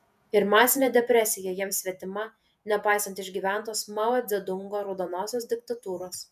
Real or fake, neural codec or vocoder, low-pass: fake; vocoder, 48 kHz, 128 mel bands, Vocos; 14.4 kHz